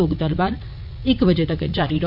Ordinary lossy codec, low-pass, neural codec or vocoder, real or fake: none; 5.4 kHz; vocoder, 44.1 kHz, 80 mel bands, Vocos; fake